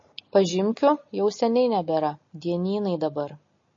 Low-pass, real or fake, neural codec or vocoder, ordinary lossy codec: 7.2 kHz; real; none; MP3, 32 kbps